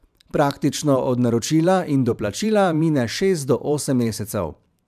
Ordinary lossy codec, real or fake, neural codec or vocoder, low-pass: none; fake; vocoder, 44.1 kHz, 128 mel bands every 512 samples, BigVGAN v2; 14.4 kHz